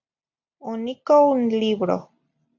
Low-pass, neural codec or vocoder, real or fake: 7.2 kHz; none; real